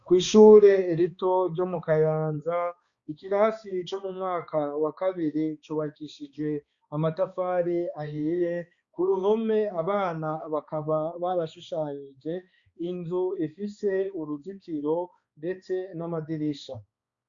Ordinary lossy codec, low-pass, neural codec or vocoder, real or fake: Opus, 64 kbps; 7.2 kHz; codec, 16 kHz, 2 kbps, X-Codec, HuBERT features, trained on balanced general audio; fake